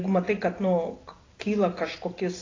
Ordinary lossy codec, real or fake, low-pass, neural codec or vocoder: AAC, 32 kbps; real; 7.2 kHz; none